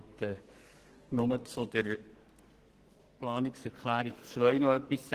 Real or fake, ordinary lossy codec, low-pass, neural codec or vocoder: fake; Opus, 16 kbps; 14.4 kHz; codec, 44.1 kHz, 2.6 kbps, SNAC